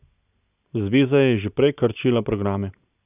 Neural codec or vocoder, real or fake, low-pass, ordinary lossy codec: none; real; 3.6 kHz; none